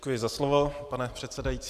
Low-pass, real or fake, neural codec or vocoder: 14.4 kHz; fake; vocoder, 44.1 kHz, 128 mel bands every 512 samples, BigVGAN v2